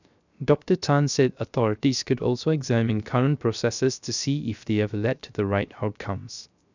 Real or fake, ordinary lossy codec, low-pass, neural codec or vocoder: fake; none; 7.2 kHz; codec, 16 kHz, 0.3 kbps, FocalCodec